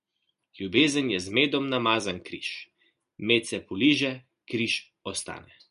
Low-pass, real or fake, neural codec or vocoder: 10.8 kHz; real; none